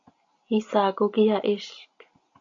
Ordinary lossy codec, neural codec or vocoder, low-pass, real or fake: AAC, 48 kbps; none; 7.2 kHz; real